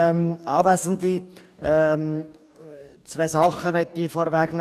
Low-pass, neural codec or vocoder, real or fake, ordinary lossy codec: 14.4 kHz; codec, 44.1 kHz, 2.6 kbps, DAC; fake; none